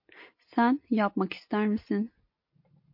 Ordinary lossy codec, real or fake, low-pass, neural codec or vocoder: MP3, 32 kbps; real; 5.4 kHz; none